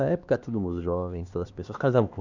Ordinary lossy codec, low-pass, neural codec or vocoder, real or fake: Opus, 64 kbps; 7.2 kHz; codec, 16 kHz, 2 kbps, X-Codec, HuBERT features, trained on LibriSpeech; fake